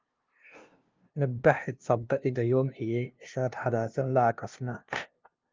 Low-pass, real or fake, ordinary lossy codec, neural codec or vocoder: 7.2 kHz; fake; Opus, 32 kbps; codec, 16 kHz, 0.5 kbps, FunCodec, trained on LibriTTS, 25 frames a second